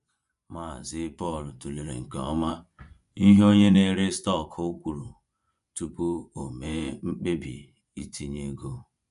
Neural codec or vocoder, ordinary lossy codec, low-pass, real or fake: vocoder, 24 kHz, 100 mel bands, Vocos; none; 10.8 kHz; fake